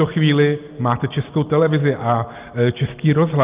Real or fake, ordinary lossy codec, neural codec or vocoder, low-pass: real; Opus, 32 kbps; none; 3.6 kHz